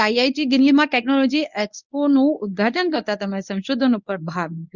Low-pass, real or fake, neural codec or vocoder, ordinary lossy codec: 7.2 kHz; fake; codec, 24 kHz, 0.9 kbps, WavTokenizer, medium speech release version 1; none